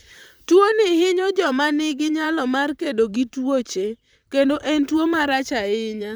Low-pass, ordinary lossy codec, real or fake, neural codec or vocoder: none; none; fake; vocoder, 44.1 kHz, 128 mel bands, Pupu-Vocoder